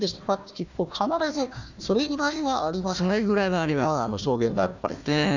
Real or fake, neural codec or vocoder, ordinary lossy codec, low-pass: fake; codec, 16 kHz, 1 kbps, FunCodec, trained on Chinese and English, 50 frames a second; none; 7.2 kHz